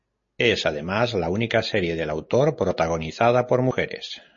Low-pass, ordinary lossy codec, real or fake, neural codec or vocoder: 7.2 kHz; MP3, 32 kbps; real; none